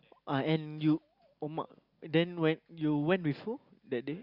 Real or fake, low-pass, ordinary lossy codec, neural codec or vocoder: real; 5.4 kHz; Opus, 64 kbps; none